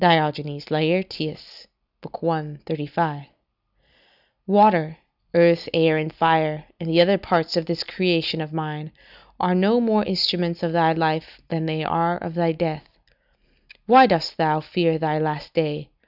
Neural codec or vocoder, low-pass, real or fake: none; 5.4 kHz; real